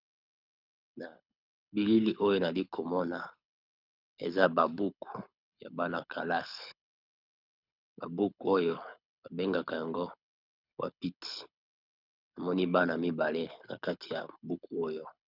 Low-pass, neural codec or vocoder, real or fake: 5.4 kHz; codec, 24 kHz, 6 kbps, HILCodec; fake